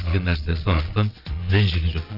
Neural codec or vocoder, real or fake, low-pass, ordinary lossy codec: vocoder, 22.05 kHz, 80 mel bands, Vocos; fake; 5.4 kHz; none